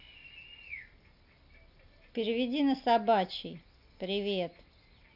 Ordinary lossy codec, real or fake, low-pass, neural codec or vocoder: none; real; 5.4 kHz; none